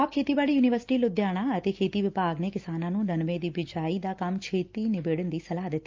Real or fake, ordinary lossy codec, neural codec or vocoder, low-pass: real; Opus, 32 kbps; none; 7.2 kHz